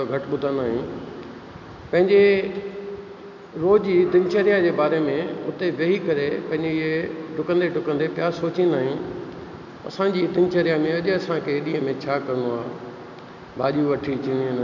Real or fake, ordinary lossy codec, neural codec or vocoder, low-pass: real; none; none; 7.2 kHz